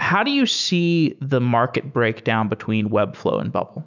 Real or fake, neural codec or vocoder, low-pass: fake; autoencoder, 48 kHz, 128 numbers a frame, DAC-VAE, trained on Japanese speech; 7.2 kHz